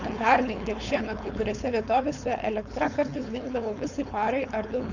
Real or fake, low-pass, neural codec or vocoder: fake; 7.2 kHz; codec, 16 kHz, 4.8 kbps, FACodec